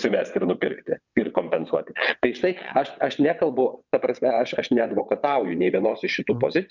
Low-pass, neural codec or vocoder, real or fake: 7.2 kHz; vocoder, 22.05 kHz, 80 mel bands, WaveNeXt; fake